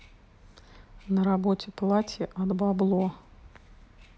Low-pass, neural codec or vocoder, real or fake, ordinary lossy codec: none; none; real; none